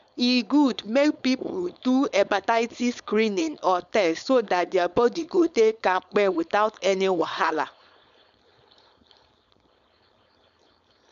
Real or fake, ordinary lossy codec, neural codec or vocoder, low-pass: fake; none; codec, 16 kHz, 4.8 kbps, FACodec; 7.2 kHz